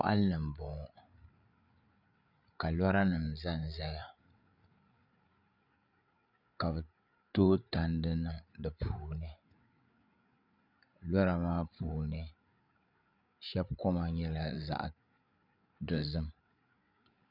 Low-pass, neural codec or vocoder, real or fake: 5.4 kHz; codec, 16 kHz, 8 kbps, FreqCodec, larger model; fake